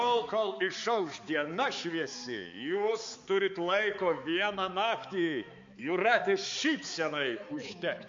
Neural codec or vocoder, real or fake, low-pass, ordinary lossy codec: codec, 16 kHz, 4 kbps, X-Codec, HuBERT features, trained on balanced general audio; fake; 7.2 kHz; MP3, 48 kbps